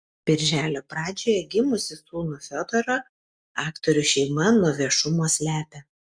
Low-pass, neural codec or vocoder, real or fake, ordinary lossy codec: 9.9 kHz; none; real; Opus, 64 kbps